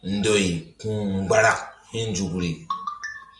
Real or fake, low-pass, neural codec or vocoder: real; 10.8 kHz; none